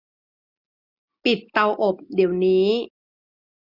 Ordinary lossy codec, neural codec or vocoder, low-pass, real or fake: none; none; 5.4 kHz; real